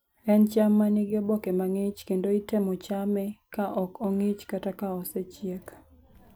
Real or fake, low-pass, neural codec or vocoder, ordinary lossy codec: real; none; none; none